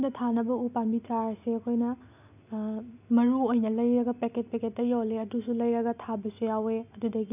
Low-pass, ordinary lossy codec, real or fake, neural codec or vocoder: 3.6 kHz; none; real; none